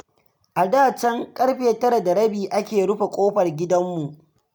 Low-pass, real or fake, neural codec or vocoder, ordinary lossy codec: none; real; none; none